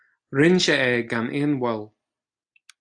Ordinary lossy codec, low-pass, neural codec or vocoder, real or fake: AAC, 64 kbps; 9.9 kHz; none; real